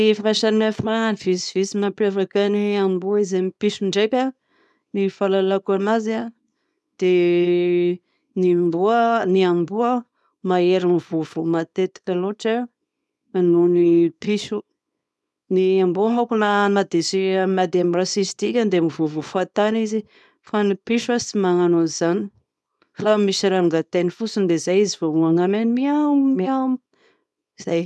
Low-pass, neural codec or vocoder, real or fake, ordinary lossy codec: none; codec, 24 kHz, 0.9 kbps, WavTokenizer, small release; fake; none